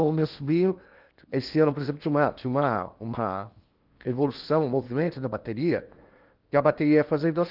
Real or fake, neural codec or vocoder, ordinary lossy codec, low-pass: fake; codec, 16 kHz in and 24 kHz out, 0.8 kbps, FocalCodec, streaming, 65536 codes; Opus, 32 kbps; 5.4 kHz